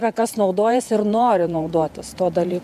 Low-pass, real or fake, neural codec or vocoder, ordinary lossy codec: 14.4 kHz; fake; vocoder, 48 kHz, 128 mel bands, Vocos; MP3, 96 kbps